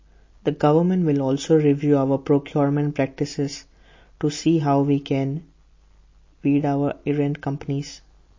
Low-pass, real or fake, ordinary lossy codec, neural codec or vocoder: 7.2 kHz; real; MP3, 32 kbps; none